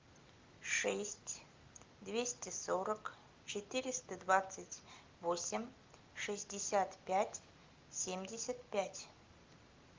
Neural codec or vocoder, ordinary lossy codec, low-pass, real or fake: none; Opus, 32 kbps; 7.2 kHz; real